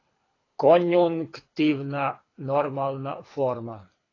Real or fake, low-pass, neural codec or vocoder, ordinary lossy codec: fake; 7.2 kHz; codec, 24 kHz, 6 kbps, HILCodec; AAC, 32 kbps